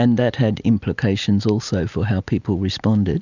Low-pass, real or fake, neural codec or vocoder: 7.2 kHz; real; none